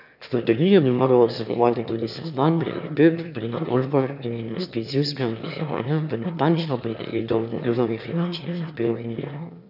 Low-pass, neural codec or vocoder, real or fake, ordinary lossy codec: 5.4 kHz; autoencoder, 22.05 kHz, a latent of 192 numbers a frame, VITS, trained on one speaker; fake; none